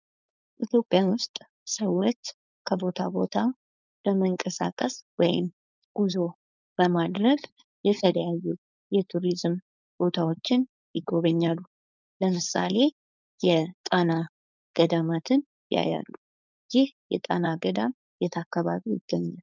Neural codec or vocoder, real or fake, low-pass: codec, 16 kHz, 4.8 kbps, FACodec; fake; 7.2 kHz